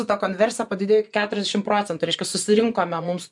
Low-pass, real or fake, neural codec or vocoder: 10.8 kHz; fake; vocoder, 24 kHz, 100 mel bands, Vocos